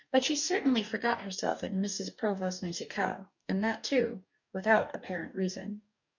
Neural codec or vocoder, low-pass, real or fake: codec, 44.1 kHz, 2.6 kbps, DAC; 7.2 kHz; fake